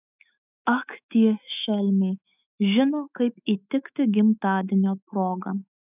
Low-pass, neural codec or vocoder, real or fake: 3.6 kHz; autoencoder, 48 kHz, 128 numbers a frame, DAC-VAE, trained on Japanese speech; fake